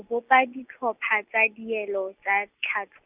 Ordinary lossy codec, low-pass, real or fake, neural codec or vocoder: Opus, 64 kbps; 3.6 kHz; real; none